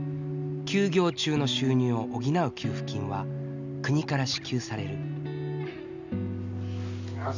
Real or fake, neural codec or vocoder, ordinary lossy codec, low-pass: real; none; none; 7.2 kHz